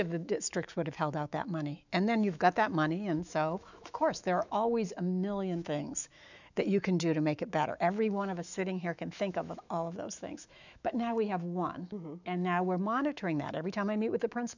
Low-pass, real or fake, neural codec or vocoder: 7.2 kHz; fake; autoencoder, 48 kHz, 128 numbers a frame, DAC-VAE, trained on Japanese speech